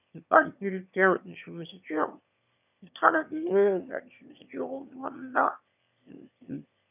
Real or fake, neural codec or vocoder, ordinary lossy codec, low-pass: fake; autoencoder, 22.05 kHz, a latent of 192 numbers a frame, VITS, trained on one speaker; none; 3.6 kHz